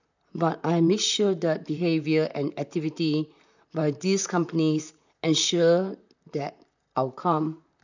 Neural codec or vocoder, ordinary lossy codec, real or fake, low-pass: vocoder, 44.1 kHz, 128 mel bands, Pupu-Vocoder; none; fake; 7.2 kHz